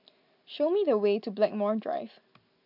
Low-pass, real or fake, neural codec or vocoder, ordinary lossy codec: 5.4 kHz; real; none; none